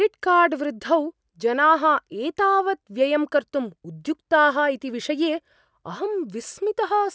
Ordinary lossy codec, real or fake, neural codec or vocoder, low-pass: none; real; none; none